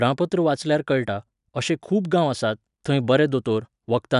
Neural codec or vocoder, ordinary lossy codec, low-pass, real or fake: none; none; 10.8 kHz; real